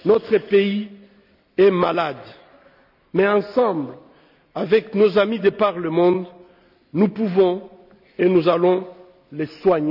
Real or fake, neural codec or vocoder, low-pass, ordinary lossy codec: real; none; 5.4 kHz; none